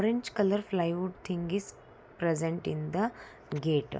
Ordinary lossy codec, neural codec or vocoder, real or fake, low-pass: none; none; real; none